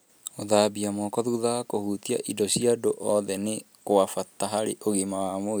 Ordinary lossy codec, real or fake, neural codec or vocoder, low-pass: none; real; none; none